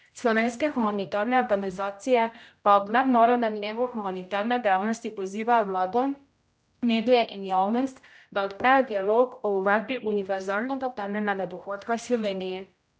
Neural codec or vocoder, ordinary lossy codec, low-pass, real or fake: codec, 16 kHz, 0.5 kbps, X-Codec, HuBERT features, trained on general audio; none; none; fake